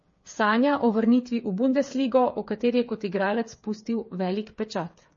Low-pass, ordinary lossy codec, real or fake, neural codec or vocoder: 7.2 kHz; MP3, 32 kbps; fake; codec, 16 kHz, 8 kbps, FreqCodec, smaller model